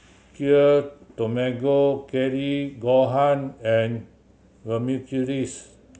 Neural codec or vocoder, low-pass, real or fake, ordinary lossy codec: none; none; real; none